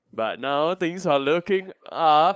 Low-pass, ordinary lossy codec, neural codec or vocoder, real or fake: none; none; codec, 16 kHz, 8 kbps, FunCodec, trained on LibriTTS, 25 frames a second; fake